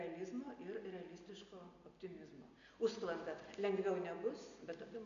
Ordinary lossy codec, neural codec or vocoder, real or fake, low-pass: MP3, 96 kbps; none; real; 7.2 kHz